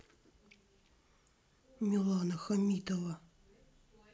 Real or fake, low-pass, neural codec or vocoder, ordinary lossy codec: real; none; none; none